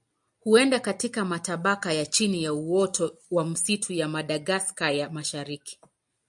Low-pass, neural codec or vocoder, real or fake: 10.8 kHz; none; real